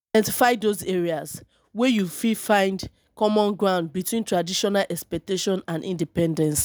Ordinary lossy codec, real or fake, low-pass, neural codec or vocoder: none; real; none; none